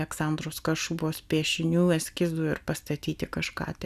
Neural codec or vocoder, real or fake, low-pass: none; real; 14.4 kHz